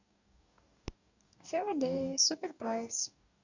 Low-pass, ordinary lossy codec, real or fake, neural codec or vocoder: 7.2 kHz; none; fake; codec, 44.1 kHz, 2.6 kbps, DAC